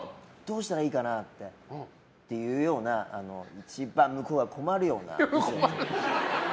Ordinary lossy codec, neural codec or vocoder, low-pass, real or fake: none; none; none; real